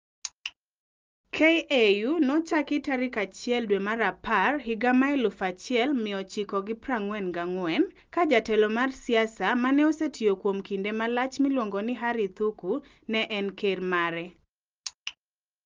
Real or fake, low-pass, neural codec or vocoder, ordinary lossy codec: real; 7.2 kHz; none; Opus, 32 kbps